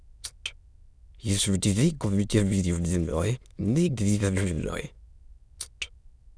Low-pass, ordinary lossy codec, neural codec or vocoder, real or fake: none; none; autoencoder, 22.05 kHz, a latent of 192 numbers a frame, VITS, trained on many speakers; fake